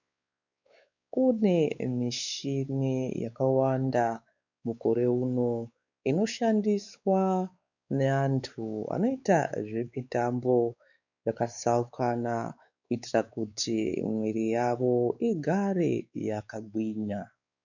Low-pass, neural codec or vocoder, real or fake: 7.2 kHz; codec, 16 kHz, 4 kbps, X-Codec, WavLM features, trained on Multilingual LibriSpeech; fake